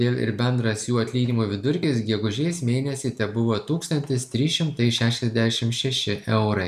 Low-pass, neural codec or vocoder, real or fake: 14.4 kHz; none; real